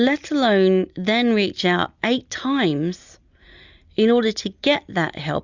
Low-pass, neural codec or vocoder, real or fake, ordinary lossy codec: 7.2 kHz; none; real; Opus, 64 kbps